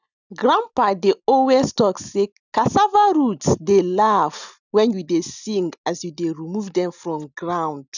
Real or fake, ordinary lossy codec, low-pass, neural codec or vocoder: real; none; 7.2 kHz; none